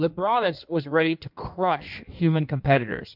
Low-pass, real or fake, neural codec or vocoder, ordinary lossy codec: 5.4 kHz; fake; codec, 16 kHz in and 24 kHz out, 1.1 kbps, FireRedTTS-2 codec; MP3, 48 kbps